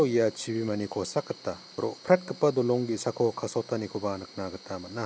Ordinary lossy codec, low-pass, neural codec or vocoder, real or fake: none; none; none; real